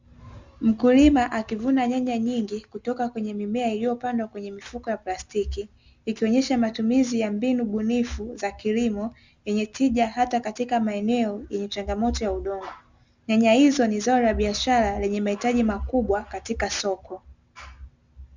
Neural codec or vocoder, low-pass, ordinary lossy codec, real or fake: none; 7.2 kHz; Opus, 64 kbps; real